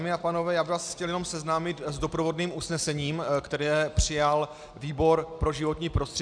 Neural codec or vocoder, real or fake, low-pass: none; real; 9.9 kHz